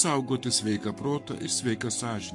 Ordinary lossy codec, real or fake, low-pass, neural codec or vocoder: MP3, 64 kbps; fake; 10.8 kHz; codec, 44.1 kHz, 7.8 kbps, Pupu-Codec